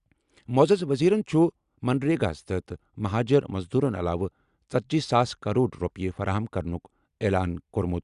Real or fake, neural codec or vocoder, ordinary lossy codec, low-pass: real; none; Opus, 64 kbps; 10.8 kHz